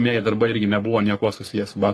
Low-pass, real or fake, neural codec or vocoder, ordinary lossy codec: 14.4 kHz; fake; codec, 44.1 kHz, 3.4 kbps, Pupu-Codec; AAC, 48 kbps